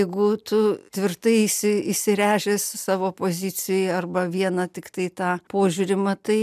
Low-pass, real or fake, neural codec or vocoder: 14.4 kHz; real; none